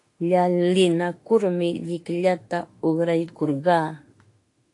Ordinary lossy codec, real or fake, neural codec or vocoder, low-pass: AAC, 48 kbps; fake; autoencoder, 48 kHz, 32 numbers a frame, DAC-VAE, trained on Japanese speech; 10.8 kHz